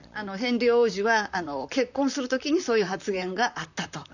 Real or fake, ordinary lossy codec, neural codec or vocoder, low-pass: fake; none; vocoder, 44.1 kHz, 128 mel bands, Pupu-Vocoder; 7.2 kHz